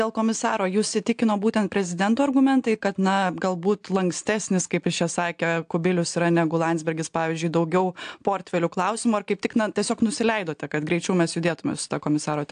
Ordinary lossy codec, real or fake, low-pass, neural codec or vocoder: AAC, 64 kbps; real; 9.9 kHz; none